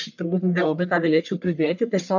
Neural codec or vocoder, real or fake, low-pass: codec, 44.1 kHz, 1.7 kbps, Pupu-Codec; fake; 7.2 kHz